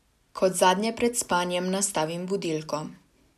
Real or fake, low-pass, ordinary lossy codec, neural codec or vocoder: real; 14.4 kHz; none; none